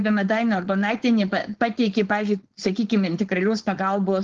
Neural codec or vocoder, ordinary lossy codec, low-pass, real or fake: codec, 16 kHz, 4.8 kbps, FACodec; Opus, 16 kbps; 7.2 kHz; fake